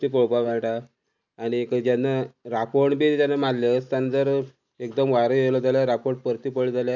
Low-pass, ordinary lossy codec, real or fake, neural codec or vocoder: 7.2 kHz; none; real; none